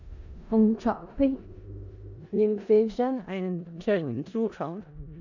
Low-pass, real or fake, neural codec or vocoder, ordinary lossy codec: 7.2 kHz; fake; codec, 16 kHz in and 24 kHz out, 0.4 kbps, LongCat-Audio-Codec, four codebook decoder; none